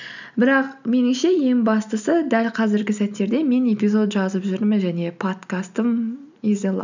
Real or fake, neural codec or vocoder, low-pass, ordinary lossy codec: real; none; 7.2 kHz; none